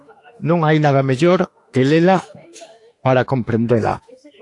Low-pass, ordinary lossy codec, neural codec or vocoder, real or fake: 10.8 kHz; AAC, 48 kbps; autoencoder, 48 kHz, 32 numbers a frame, DAC-VAE, trained on Japanese speech; fake